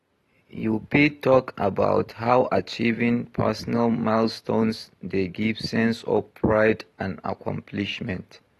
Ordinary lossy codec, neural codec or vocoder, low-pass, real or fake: AAC, 32 kbps; none; 19.8 kHz; real